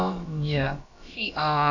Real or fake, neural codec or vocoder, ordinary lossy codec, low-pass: fake; codec, 16 kHz, about 1 kbps, DyCAST, with the encoder's durations; none; 7.2 kHz